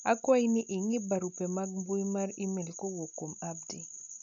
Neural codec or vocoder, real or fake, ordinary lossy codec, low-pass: none; real; none; 7.2 kHz